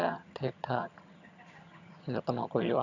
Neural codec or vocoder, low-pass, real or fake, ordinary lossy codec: vocoder, 22.05 kHz, 80 mel bands, HiFi-GAN; 7.2 kHz; fake; none